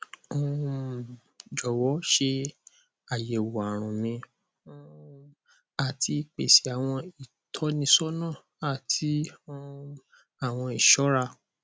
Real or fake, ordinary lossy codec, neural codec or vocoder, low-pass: real; none; none; none